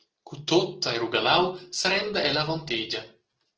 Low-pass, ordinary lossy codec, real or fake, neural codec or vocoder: 7.2 kHz; Opus, 16 kbps; real; none